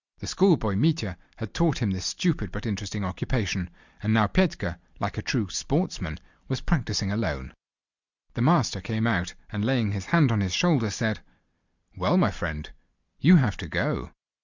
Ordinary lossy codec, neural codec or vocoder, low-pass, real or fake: Opus, 64 kbps; none; 7.2 kHz; real